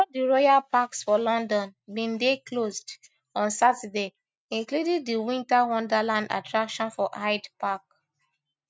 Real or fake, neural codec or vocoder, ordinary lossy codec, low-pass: real; none; none; none